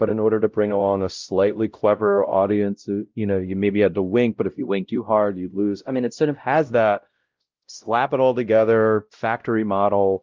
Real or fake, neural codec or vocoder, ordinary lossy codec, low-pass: fake; codec, 16 kHz, 0.5 kbps, X-Codec, WavLM features, trained on Multilingual LibriSpeech; Opus, 32 kbps; 7.2 kHz